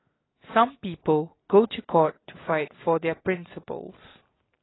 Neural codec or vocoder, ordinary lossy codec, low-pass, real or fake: none; AAC, 16 kbps; 7.2 kHz; real